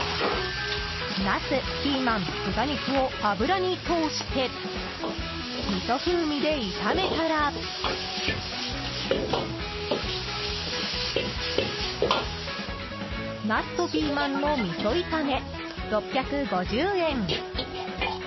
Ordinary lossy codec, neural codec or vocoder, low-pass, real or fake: MP3, 24 kbps; none; 7.2 kHz; real